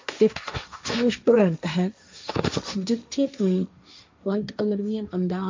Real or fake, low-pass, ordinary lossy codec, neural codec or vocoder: fake; 7.2 kHz; MP3, 64 kbps; codec, 16 kHz, 1.1 kbps, Voila-Tokenizer